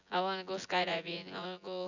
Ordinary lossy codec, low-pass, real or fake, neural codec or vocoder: none; 7.2 kHz; fake; vocoder, 24 kHz, 100 mel bands, Vocos